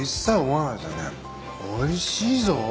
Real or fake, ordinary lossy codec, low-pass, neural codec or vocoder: real; none; none; none